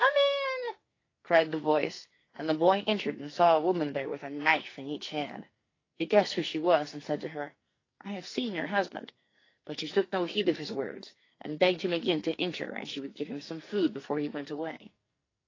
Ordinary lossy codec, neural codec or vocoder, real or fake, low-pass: AAC, 32 kbps; codec, 44.1 kHz, 2.6 kbps, SNAC; fake; 7.2 kHz